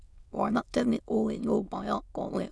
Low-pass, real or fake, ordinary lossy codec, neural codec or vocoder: none; fake; none; autoencoder, 22.05 kHz, a latent of 192 numbers a frame, VITS, trained on many speakers